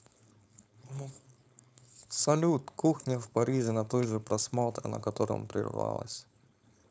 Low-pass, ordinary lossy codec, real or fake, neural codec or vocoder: none; none; fake; codec, 16 kHz, 4.8 kbps, FACodec